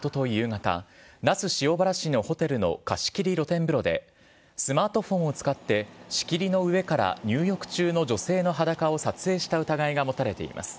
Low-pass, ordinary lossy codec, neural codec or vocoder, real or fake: none; none; none; real